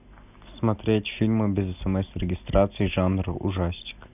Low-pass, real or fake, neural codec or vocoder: 3.6 kHz; real; none